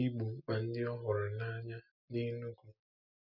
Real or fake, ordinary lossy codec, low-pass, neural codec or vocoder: real; none; 5.4 kHz; none